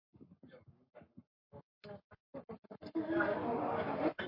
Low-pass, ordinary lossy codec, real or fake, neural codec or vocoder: 5.4 kHz; MP3, 32 kbps; fake; codec, 44.1 kHz, 7.8 kbps, Pupu-Codec